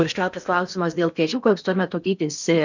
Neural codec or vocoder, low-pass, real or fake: codec, 16 kHz in and 24 kHz out, 0.6 kbps, FocalCodec, streaming, 2048 codes; 7.2 kHz; fake